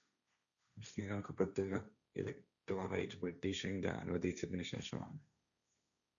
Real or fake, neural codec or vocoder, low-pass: fake; codec, 16 kHz, 1.1 kbps, Voila-Tokenizer; 7.2 kHz